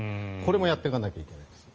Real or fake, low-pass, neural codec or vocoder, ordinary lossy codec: real; 7.2 kHz; none; Opus, 24 kbps